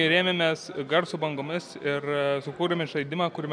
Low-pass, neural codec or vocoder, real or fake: 9.9 kHz; none; real